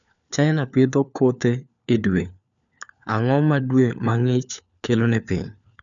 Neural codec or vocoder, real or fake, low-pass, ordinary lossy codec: codec, 16 kHz, 4 kbps, FunCodec, trained on LibriTTS, 50 frames a second; fake; 7.2 kHz; none